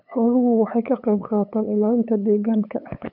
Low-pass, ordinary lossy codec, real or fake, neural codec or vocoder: 5.4 kHz; none; fake; codec, 16 kHz, 8 kbps, FunCodec, trained on LibriTTS, 25 frames a second